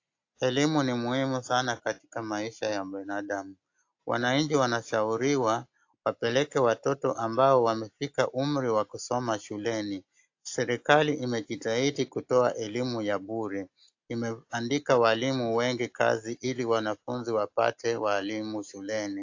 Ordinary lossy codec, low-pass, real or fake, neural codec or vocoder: AAC, 48 kbps; 7.2 kHz; real; none